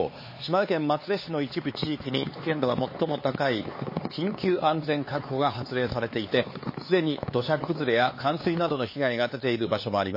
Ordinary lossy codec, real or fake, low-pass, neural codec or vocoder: MP3, 24 kbps; fake; 5.4 kHz; codec, 16 kHz, 4 kbps, X-Codec, HuBERT features, trained on LibriSpeech